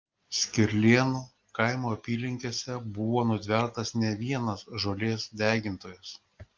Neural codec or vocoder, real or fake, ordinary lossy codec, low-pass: none; real; Opus, 32 kbps; 7.2 kHz